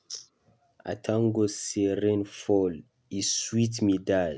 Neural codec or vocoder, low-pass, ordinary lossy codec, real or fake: none; none; none; real